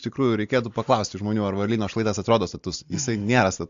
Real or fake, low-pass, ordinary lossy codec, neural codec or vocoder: real; 7.2 kHz; AAC, 64 kbps; none